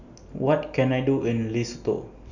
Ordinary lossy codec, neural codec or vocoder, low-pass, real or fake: none; none; 7.2 kHz; real